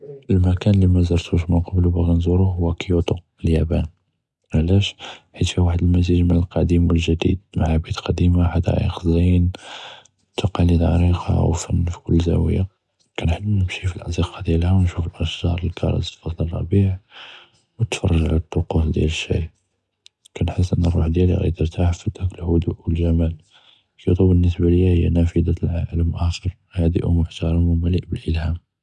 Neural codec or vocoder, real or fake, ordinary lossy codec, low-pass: none; real; none; none